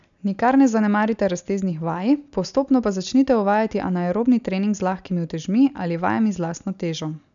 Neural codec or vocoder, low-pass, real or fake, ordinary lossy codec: none; 7.2 kHz; real; none